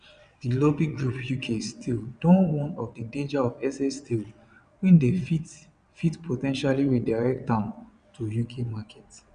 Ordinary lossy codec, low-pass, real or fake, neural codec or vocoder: none; 9.9 kHz; fake; vocoder, 22.05 kHz, 80 mel bands, WaveNeXt